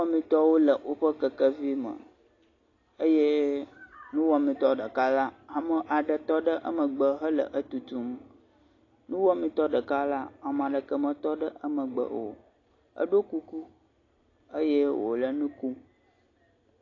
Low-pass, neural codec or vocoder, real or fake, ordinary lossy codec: 7.2 kHz; none; real; AAC, 32 kbps